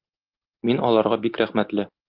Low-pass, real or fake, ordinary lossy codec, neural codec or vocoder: 5.4 kHz; real; Opus, 32 kbps; none